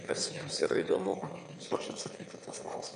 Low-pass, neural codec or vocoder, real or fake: 9.9 kHz; autoencoder, 22.05 kHz, a latent of 192 numbers a frame, VITS, trained on one speaker; fake